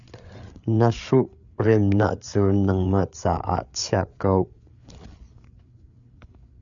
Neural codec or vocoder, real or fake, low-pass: codec, 16 kHz, 8 kbps, FreqCodec, larger model; fake; 7.2 kHz